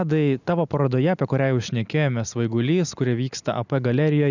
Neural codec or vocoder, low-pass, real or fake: none; 7.2 kHz; real